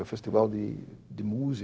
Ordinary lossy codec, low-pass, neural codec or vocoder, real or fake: none; none; codec, 16 kHz, 0.4 kbps, LongCat-Audio-Codec; fake